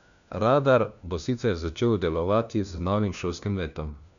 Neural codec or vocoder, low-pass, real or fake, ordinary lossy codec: codec, 16 kHz, 1 kbps, FunCodec, trained on LibriTTS, 50 frames a second; 7.2 kHz; fake; none